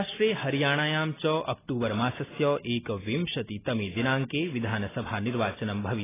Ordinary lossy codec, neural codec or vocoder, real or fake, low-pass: AAC, 16 kbps; none; real; 3.6 kHz